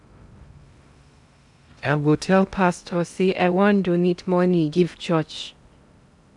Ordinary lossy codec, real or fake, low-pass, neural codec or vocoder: none; fake; 10.8 kHz; codec, 16 kHz in and 24 kHz out, 0.8 kbps, FocalCodec, streaming, 65536 codes